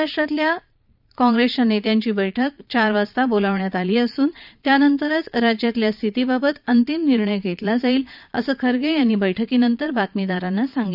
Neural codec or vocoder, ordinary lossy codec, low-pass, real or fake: vocoder, 22.05 kHz, 80 mel bands, Vocos; none; 5.4 kHz; fake